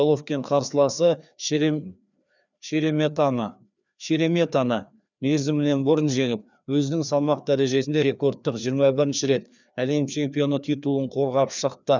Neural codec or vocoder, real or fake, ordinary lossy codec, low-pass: codec, 16 kHz, 2 kbps, FreqCodec, larger model; fake; none; 7.2 kHz